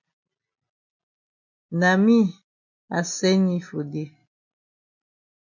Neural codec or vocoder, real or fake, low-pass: none; real; 7.2 kHz